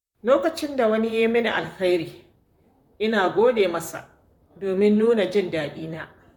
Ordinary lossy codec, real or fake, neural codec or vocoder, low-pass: none; fake; vocoder, 44.1 kHz, 128 mel bands, Pupu-Vocoder; 19.8 kHz